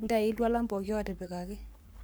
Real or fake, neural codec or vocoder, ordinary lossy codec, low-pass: fake; codec, 44.1 kHz, 7.8 kbps, Pupu-Codec; none; none